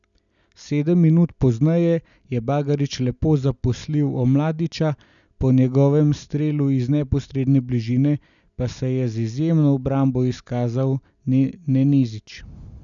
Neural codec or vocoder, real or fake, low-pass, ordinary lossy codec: none; real; 7.2 kHz; none